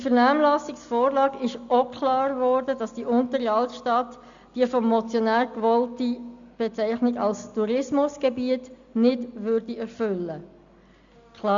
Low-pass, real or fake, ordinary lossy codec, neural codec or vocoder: 7.2 kHz; real; Opus, 64 kbps; none